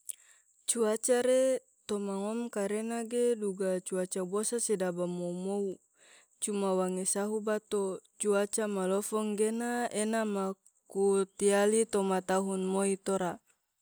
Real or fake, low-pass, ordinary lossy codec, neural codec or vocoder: real; none; none; none